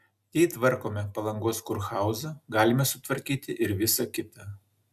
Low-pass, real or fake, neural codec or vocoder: 14.4 kHz; real; none